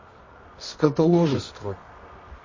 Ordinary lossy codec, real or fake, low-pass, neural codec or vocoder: MP3, 32 kbps; fake; 7.2 kHz; codec, 24 kHz, 0.9 kbps, WavTokenizer, medium music audio release